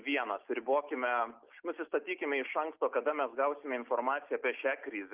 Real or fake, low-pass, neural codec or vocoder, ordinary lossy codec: real; 3.6 kHz; none; Opus, 24 kbps